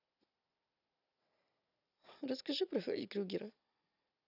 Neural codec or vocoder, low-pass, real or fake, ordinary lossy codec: none; 5.4 kHz; real; none